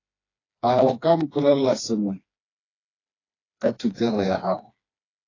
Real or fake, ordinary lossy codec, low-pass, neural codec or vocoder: fake; AAC, 32 kbps; 7.2 kHz; codec, 16 kHz, 2 kbps, FreqCodec, smaller model